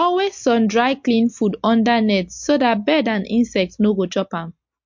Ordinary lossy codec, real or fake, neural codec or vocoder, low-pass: MP3, 48 kbps; real; none; 7.2 kHz